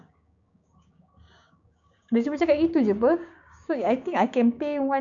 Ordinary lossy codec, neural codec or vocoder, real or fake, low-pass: none; codec, 16 kHz, 6 kbps, DAC; fake; 7.2 kHz